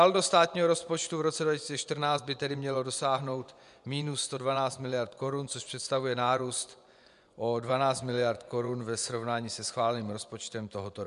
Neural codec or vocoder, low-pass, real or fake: vocoder, 24 kHz, 100 mel bands, Vocos; 10.8 kHz; fake